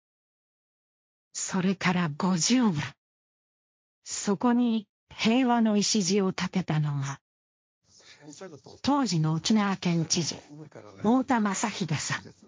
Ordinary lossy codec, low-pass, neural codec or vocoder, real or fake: none; none; codec, 16 kHz, 1.1 kbps, Voila-Tokenizer; fake